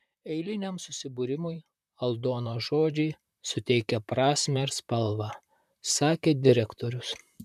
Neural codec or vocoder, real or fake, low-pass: vocoder, 44.1 kHz, 128 mel bands, Pupu-Vocoder; fake; 14.4 kHz